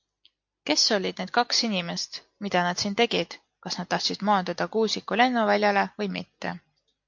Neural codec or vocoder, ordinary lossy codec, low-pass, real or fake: none; MP3, 48 kbps; 7.2 kHz; real